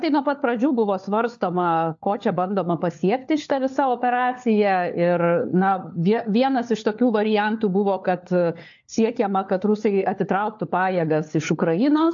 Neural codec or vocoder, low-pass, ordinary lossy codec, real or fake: codec, 16 kHz, 4 kbps, FunCodec, trained on LibriTTS, 50 frames a second; 7.2 kHz; AAC, 64 kbps; fake